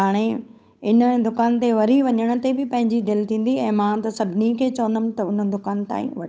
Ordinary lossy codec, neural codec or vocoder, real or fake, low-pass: none; codec, 16 kHz, 8 kbps, FunCodec, trained on Chinese and English, 25 frames a second; fake; none